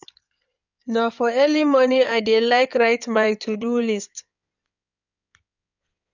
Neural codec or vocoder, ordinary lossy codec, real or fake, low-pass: codec, 16 kHz in and 24 kHz out, 2.2 kbps, FireRedTTS-2 codec; none; fake; 7.2 kHz